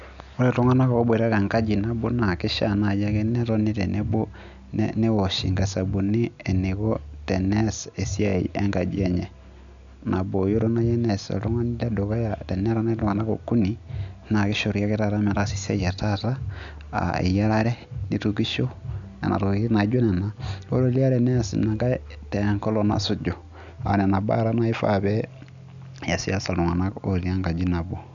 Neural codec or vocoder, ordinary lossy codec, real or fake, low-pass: none; none; real; 7.2 kHz